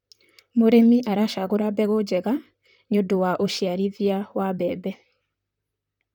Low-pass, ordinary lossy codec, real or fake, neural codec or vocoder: 19.8 kHz; none; fake; vocoder, 44.1 kHz, 128 mel bands, Pupu-Vocoder